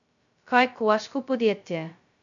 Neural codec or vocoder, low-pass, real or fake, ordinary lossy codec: codec, 16 kHz, 0.2 kbps, FocalCodec; 7.2 kHz; fake; none